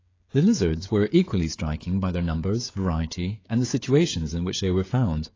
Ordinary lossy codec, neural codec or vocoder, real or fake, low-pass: AAC, 32 kbps; codec, 16 kHz, 16 kbps, FreqCodec, smaller model; fake; 7.2 kHz